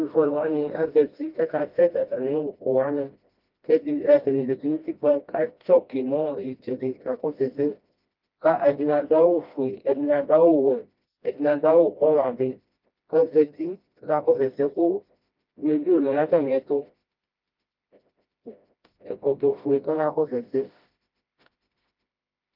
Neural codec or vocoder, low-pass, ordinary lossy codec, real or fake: codec, 16 kHz, 1 kbps, FreqCodec, smaller model; 5.4 kHz; Opus, 24 kbps; fake